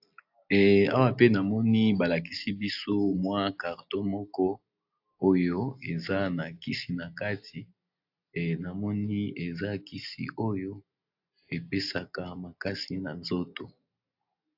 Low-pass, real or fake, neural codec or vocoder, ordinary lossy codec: 5.4 kHz; real; none; AAC, 48 kbps